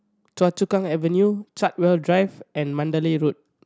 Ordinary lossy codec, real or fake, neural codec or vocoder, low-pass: none; real; none; none